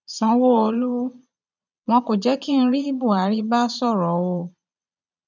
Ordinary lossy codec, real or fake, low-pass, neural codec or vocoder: none; fake; 7.2 kHz; vocoder, 22.05 kHz, 80 mel bands, Vocos